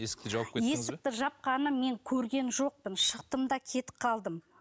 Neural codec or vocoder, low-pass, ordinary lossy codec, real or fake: none; none; none; real